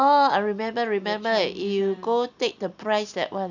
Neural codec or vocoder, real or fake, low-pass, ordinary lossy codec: none; real; 7.2 kHz; none